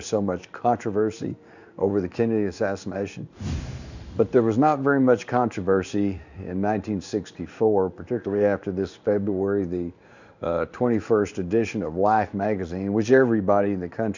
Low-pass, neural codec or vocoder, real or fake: 7.2 kHz; codec, 16 kHz in and 24 kHz out, 1 kbps, XY-Tokenizer; fake